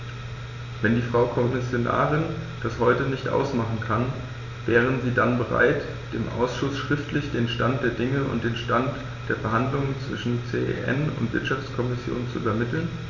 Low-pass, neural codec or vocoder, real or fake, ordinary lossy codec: 7.2 kHz; none; real; none